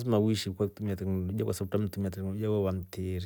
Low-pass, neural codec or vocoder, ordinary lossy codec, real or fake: none; none; none; real